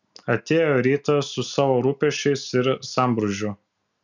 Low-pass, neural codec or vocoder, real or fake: 7.2 kHz; none; real